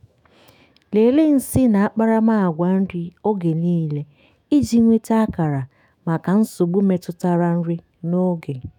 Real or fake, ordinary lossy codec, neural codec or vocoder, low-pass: fake; none; autoencoder, 48 kHz, 128 numbers a frame, DAC-VAE, trained on Japanese speech; 19.8 kHz